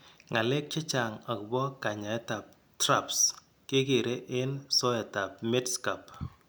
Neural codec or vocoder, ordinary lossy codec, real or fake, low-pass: none; none; real; none